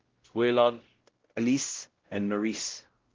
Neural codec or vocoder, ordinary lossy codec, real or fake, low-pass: codec, 16 kHz, 0.5 kbps, X-Codec, WavLM features, trained on Multilingual LibriSpeech; Opus, 16 kbps; fake; 7.2 kHz